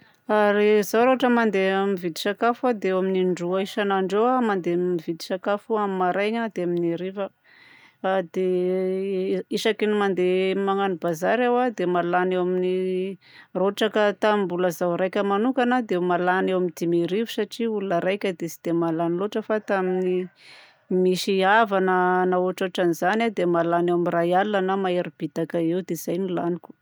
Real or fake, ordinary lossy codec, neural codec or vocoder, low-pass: real; none; none; none